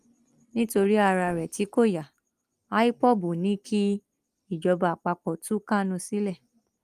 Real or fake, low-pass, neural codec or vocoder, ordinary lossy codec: real; 14.4 kHz; none; Opus, 32 kbps